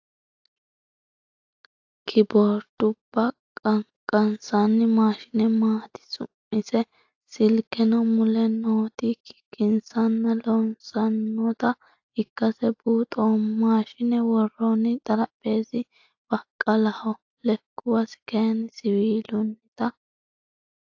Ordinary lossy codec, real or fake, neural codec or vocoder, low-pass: AAC, 48 kbps; real; none; 7.2 kHz